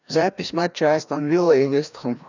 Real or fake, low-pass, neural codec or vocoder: fake; 7.2 kHz; codec, 16 kHz, 1 kbps, FreqCodec, larger model